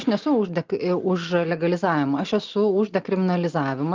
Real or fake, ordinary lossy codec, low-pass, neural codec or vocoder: real; Opus, 32 kbps; 7.2 kHz; none